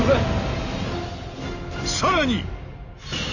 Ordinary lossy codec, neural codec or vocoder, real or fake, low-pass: none; none; real; 7.2 kHz